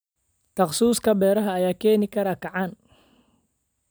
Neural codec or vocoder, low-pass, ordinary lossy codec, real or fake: none; none; none; real